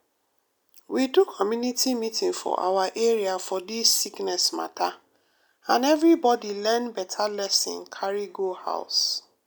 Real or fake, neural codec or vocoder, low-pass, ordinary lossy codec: real; none; none; none